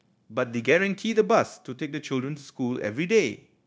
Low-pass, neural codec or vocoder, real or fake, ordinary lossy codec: none; codec, 16 kHz, 0.9 kbps, LongCat-Audio-Codec; fake; none